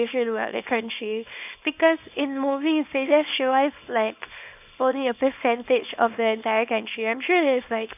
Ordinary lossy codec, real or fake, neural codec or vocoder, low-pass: none; fake; codec, 24 kHz, 0.9 kbps, WavTokenizer, small release; 3.6 kHz